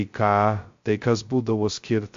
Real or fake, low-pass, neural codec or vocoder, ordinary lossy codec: fake; 7.2 kHz; codec, 16 kHz, 0.2 kbps, FocalCodec; MP3, 48 kbps